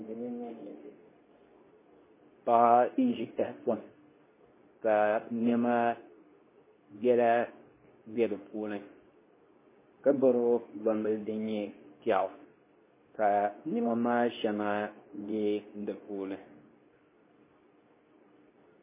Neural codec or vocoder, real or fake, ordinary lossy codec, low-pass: codec, 24 kHz, 0.9 kbps, WavTokenizer, medium speech release version 2; fake; MP3, 16 kbps; 3.6 kHz